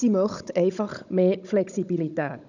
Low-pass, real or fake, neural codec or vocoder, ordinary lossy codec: 7.2 kHz; fake; codec, 16 kHz, 16 kbps, FunCodec, trained on Chinese and English, 50 frames a second; none